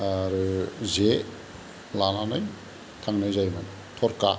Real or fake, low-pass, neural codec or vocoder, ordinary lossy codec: real; none; none; none